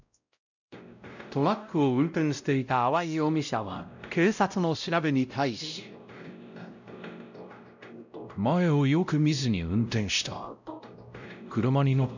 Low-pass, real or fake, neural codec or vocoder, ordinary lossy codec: 7.2 kHz; fake; codec, 16 kHz, 0.5 kbps, X-Codec, WavLM features, trained on Multilingual LibriSpeech; none